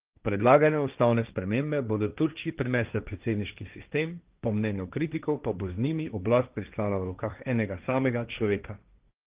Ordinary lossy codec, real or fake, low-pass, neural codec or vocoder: Opus, 32 kbps; fake; 3.6 kHz; codec, 16 kHz, 1.1 kbps, Voila-Tokenizer